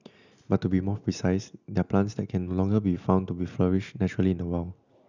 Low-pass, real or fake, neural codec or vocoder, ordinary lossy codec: 7.2 kHz; real; none; none